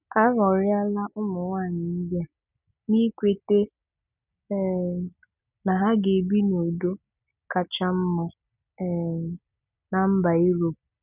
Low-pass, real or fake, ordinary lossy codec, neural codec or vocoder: 3.6 kHz; real; none; none